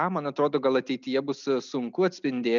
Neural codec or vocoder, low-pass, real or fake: none; 7.2 kHz; real